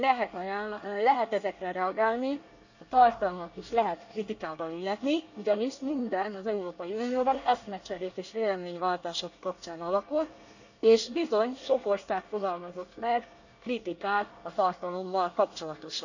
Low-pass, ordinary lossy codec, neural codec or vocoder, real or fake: 7.2 kHz; none; codec, 24 kHz, 1 kbps, SNAC; fake